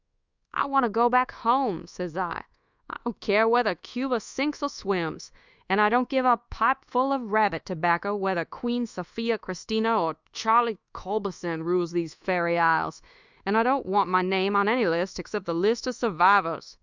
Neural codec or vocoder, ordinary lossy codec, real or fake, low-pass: codec, 24 kHz, 1.2 kbps, DualCodec; Opus, 64 kbps; fake; 7.2 kHz